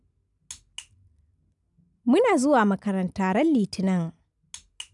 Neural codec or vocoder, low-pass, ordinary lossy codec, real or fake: none; 10.8 kHz; none; real